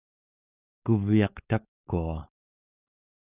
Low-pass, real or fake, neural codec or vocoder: 3.6 kHz; fake; codec, 16 kHz, 4.8 kbps, FACodec